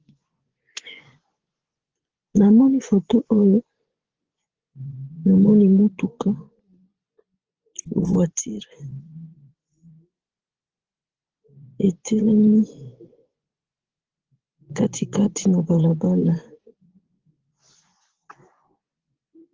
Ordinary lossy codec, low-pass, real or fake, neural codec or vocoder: Opus, 16 kbps; 7.2 kHz; fake; vocoder, 24 kHz, 100 mel bands, Vocos